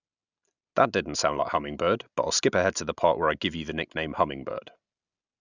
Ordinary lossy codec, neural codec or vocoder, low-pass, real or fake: none; none; 7.2 kHz; real